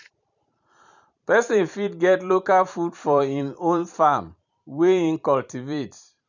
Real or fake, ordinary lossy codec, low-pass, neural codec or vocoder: fake; none; 7.2 kHz; vocoder, 44.1 kHz, 128 mel bands every 512 samples, BigVGAN v2